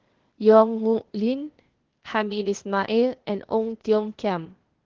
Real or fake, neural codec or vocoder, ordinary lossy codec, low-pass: fake; codec, 16 kHz, 0.8 kbps, ZipCodec; Opus, 16 kbps; 7.2 kHz